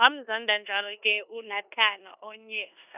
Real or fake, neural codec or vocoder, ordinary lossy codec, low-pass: fake; codec, 16 kHz in and 24 kHz out, 0.9 kbps, LongCat-Audio-Codec, four codebook decoder; none; 3.6 kHz